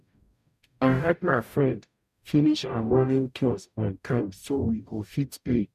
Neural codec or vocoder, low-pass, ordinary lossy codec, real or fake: codec, 44.1 kHz, 0.9 kbps, DAC; 14.4 kHz; none; fake